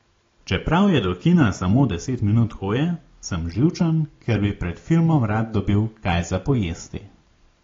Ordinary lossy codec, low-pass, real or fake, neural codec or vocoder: AAC, 32 kbps; 7.2 kHz; real; none